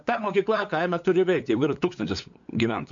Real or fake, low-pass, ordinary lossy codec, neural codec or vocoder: fake; 7.2 kHz; MP3, 64 kbps; codec, 16 kHz, 8 kbps, FunCodec, trained on LibriTTS, 25 frames a second